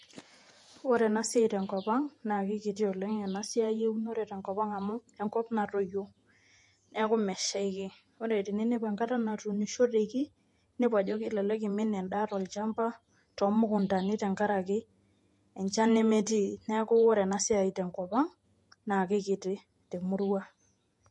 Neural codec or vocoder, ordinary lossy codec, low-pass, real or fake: vocoder, 48 kHz, 128 mel bands, Vocos; MP3, 48 kbps; 10.8 kHz; fake